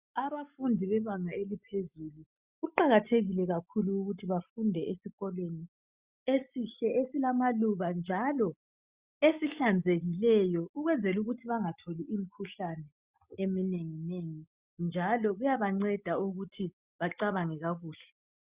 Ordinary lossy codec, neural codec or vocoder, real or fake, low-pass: Opus, 64 kbps; none; real; 3.6 kHz